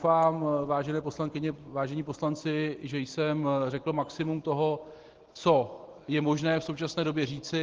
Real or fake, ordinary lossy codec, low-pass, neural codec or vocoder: real; Opus, 16 kbps; 7.2 kHz; none